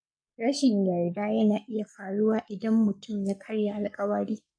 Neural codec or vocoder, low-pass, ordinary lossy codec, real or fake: codec, 44.1 kHz, 7.8 kbps, Pupu-Codec; 9.9 kHz; AAC, 64 kbps; fake